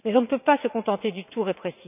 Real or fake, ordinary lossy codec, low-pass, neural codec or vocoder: fake; none; 3.6 kHz; vocoder, 44.1 kHz, 80 mel bands, Vocos